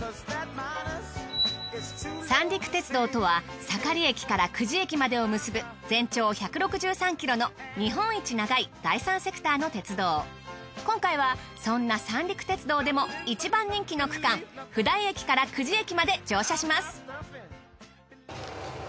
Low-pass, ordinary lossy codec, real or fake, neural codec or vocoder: none; none; real; none